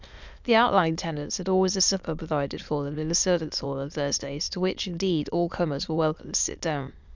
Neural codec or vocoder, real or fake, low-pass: autoencoder, 22.05 kHz, a latent of 192 numbers a frame, VITS, trained on many speakers; fake; 7.2 kHz